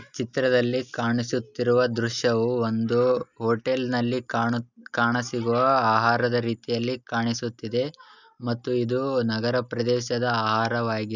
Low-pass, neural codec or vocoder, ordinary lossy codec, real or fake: 7.2 kHz; none; none; real